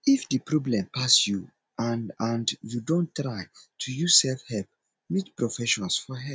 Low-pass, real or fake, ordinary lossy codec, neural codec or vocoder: none; real; none; none